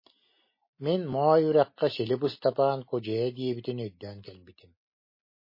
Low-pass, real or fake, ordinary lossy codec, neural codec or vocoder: 5.4 kHz; real; MP3, 24 kbps; none